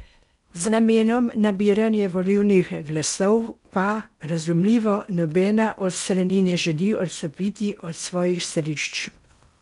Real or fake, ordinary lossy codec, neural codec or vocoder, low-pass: fake; none; codec, 16 kHz in and 24 kHz out, 0.8 kbps, FocalCodec, streaming, 65536 codes; 10.8 kHz